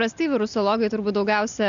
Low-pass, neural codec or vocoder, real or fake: 7.2 kHz; none; real